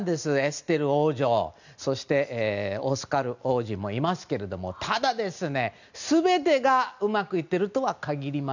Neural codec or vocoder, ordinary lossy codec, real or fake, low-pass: none; none; real; 7.2 kHz